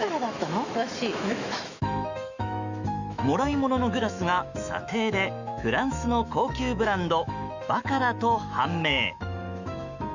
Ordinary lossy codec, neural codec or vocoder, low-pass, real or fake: Opus, 64 kbps; autoencoder, 48 kHz, 128 numbers a frame, DAC-VAE, trained on Japanese speech; 7.2 kHz; fake